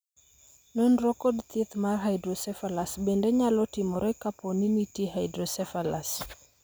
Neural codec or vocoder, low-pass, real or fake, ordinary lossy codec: none; none; real; none